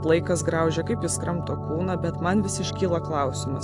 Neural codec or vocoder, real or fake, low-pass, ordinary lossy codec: none; real; 10.8 kHz; AAC, 64 kbps